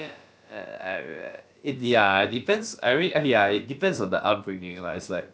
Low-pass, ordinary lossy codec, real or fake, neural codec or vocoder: none; none; fake; codec, 16 kHz, about 1 kbps, DyCAST, with the encoder's durations